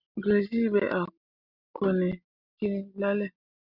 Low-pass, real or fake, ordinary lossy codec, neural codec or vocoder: 5.4 kHz; real; Opus, 64 kbps; none